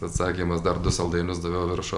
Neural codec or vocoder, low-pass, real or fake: none; 10.8 kHz; real